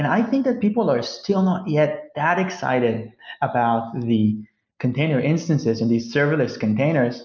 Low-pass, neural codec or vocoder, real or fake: 7.2 kHz; none; real